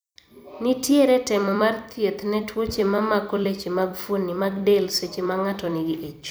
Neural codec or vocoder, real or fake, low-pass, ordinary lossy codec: vocoder, 44.1 kHz, 128 mel bands every 256 samples, BigVGAN v2; fake; none; none